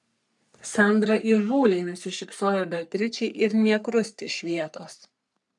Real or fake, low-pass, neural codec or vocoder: fake; 10.8 kHz; codec, 44.1 kHz, 3.4 kbps, Pupu-Codec